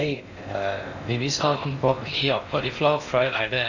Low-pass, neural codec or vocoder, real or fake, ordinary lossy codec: 7.2 kHz; codec, 16 kHz in and 24 kHz out, 0.6 kbps, FocalCodec, streaming, 4096 codes; fake; none